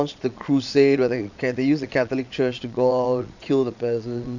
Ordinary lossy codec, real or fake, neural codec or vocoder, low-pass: none; fake; vocoder, 22.05 kHz, 80 mel bands, Vocos; 7.2 kHz